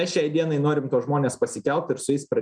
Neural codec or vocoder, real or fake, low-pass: none; real; 9.9 kHz